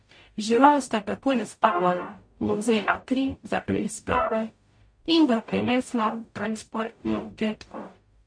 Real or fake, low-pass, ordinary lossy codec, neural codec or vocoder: fake; 9.9 kHz; MP3, 48 kbps; codec, 44.1 kHz, 0.9 kbps, DAC